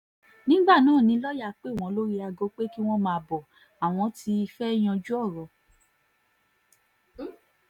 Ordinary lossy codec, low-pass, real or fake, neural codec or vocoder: none; 19.8 kHz; real; none